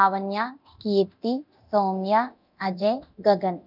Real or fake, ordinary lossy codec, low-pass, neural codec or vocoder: fake; none; 5.4 kHz; codec, 24 kHz, 0.9 kbps, DualCodec